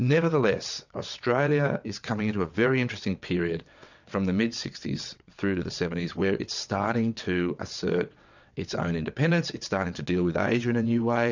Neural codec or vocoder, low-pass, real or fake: vocoder, 22.05 kHz, 80 mel bands, WaveNeXt; 7.2 kHz; fake